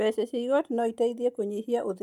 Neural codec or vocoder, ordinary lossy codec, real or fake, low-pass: none; none; real; 19.8 kHz